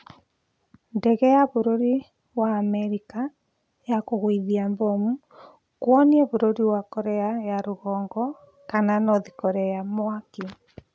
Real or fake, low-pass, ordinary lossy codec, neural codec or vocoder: real; none; none; none